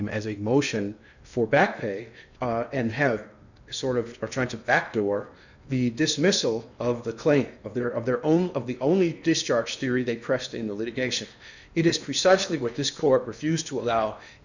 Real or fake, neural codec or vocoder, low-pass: fake; codec, 16 kHz in and 24 kHz out, 0.8 kbps, FocalCodec, streaming, 65536 codes; 7.2 kHz